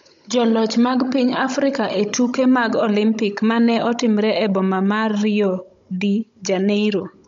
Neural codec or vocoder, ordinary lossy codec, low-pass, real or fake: codec, 16 kHz, 16 kbps, FunCodec, trained on Chinese and English, 50 frames a second; MP3, 48 kbps; 7.2 kHz; fake